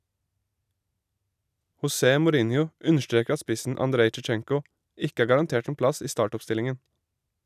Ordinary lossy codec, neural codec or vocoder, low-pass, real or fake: none; none; 14.4 kHz; real